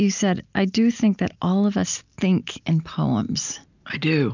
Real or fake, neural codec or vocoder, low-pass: real; none; 7.2 kHz